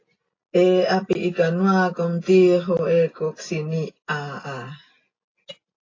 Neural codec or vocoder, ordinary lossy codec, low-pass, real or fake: none; AAC, 32 kbps; 7.2 kHz; real